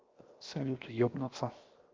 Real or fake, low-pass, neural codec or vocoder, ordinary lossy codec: fake; 7.2 kHz; codec, 16 kHz, 0.7 kbps, FocalCodec; Opus, 32 kbps